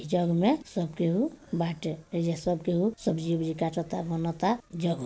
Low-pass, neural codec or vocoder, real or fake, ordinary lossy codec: none; none; real; none